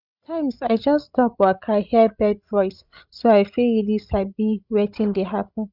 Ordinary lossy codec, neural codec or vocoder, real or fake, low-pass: none; codec, 16 kHz, 16 kbps, FreqCodec, larger model; fake; 5.4 kHz